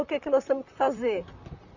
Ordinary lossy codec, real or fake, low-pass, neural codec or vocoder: none; fake; 7.2 kHz; vocoder, 44.1 kHz, 128 mel bands, Pupu-Vocoder